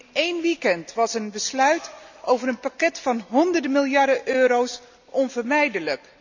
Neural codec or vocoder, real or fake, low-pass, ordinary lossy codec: none; real; 7.2 kHz; none